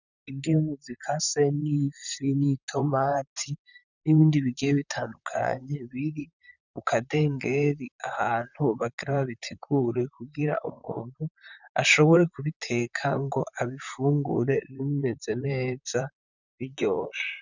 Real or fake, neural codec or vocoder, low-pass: fake; vocoder, 44.1 kHz, 128 mel bands, Pupu-Vocoder; 7.2 kHz